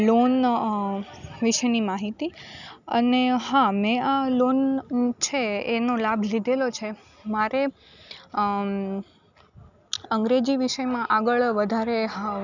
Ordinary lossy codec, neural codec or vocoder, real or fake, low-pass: none; none; real; 7.2 kHz